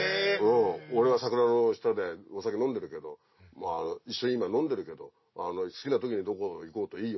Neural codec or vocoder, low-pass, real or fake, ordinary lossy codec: none; 7.2 kHz; real; MP3, 24 kbps